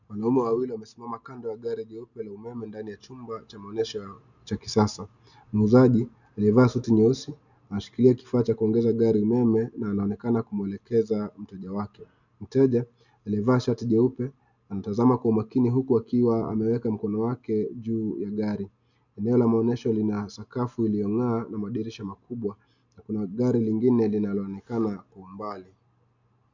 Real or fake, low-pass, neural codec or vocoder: real; 7.2 kHz; none